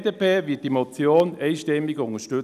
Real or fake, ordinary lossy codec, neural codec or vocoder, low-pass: real; none; none; 14.4 kHz